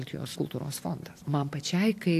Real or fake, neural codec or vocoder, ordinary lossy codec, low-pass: real; none; AAC, 64 kbps; 14.4 kHz